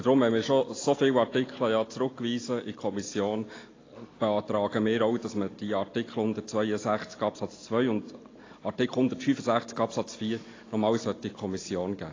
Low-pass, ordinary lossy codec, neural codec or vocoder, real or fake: 7.2 kHz; AAC, 32 kbps; none; real